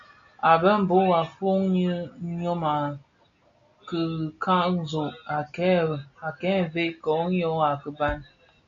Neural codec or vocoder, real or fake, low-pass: none; real; 7.2 kHz